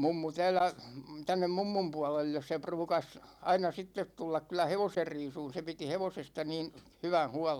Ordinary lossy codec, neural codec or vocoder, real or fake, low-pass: none; none; real; 19.8 kHz